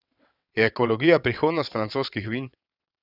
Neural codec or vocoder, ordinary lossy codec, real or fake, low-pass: vocoder, 22.05 kHz, 80 mel bands, WaveNeXt; none; fake; 5.4 kHz